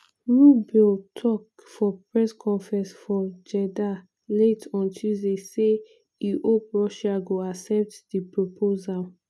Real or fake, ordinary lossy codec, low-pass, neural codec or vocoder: real; none; none; none